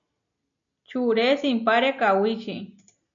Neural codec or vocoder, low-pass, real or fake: none; 7.2 kHz; real